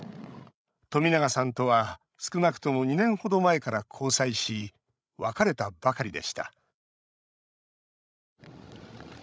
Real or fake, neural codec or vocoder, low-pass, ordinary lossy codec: fake; codec, 16 kHz, 16 kbps, FreqCodec, larger model; none; none